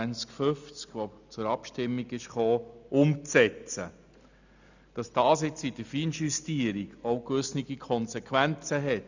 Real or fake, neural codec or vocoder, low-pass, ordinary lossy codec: real; none; 7.2 kHz; none